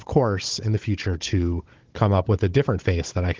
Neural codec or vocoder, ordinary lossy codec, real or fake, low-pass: codec, 16 kHz, 16 kbps, FunCodec, trained on Chinese and English, 50 frames a second; Opus, 16 kbps; fake; 7.2 kHz